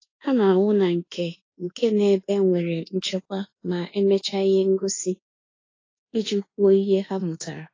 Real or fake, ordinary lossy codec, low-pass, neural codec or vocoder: fake; AAC, 32 kbps; 7.2 kHz; codec, 24 kHz, 1.2 kbps, DualCodec